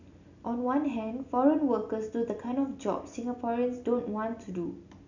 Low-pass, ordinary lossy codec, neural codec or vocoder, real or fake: 7.2 kHz; none; none; real